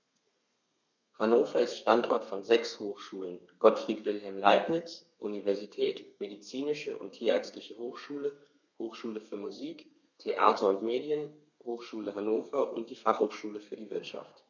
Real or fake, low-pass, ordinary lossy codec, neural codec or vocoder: fake; 7.2 kHz; none; codec, 32 kHz, 1.9 kbps, SNAC